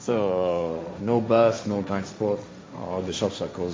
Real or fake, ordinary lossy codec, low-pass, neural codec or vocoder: fake; none; none; codec, 16 kHz, 1.1 kbps, Voila-Tokenizer